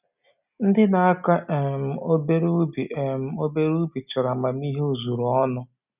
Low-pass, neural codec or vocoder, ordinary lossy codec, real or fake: 3.6 kHz; none; none; real